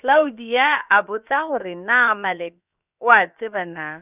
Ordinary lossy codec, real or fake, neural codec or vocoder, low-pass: none; fake; codec, 16 kHz, about 1 kbps, DyCAST, with the encoder's durations; 3.6 kHz